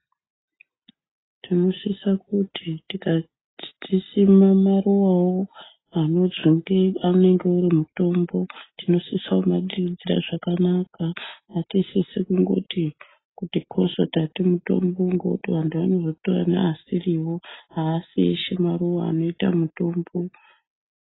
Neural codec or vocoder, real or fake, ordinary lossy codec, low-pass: none; real; AAC, 16 kbps; 7.2 kHz